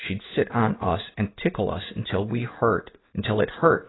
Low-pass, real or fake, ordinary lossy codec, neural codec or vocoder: 7.2 kHz; fake; AAC, 16 kbps; codec, 16 kHz in and 24 kHz out, 1 kbps, XY-Tokenizer